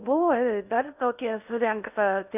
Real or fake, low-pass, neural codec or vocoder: fake; 3.6 kHz; codec, 16 kHz in and 24 kHz out, 0.6 kbps, FocalCodec, streaming, 4096 codes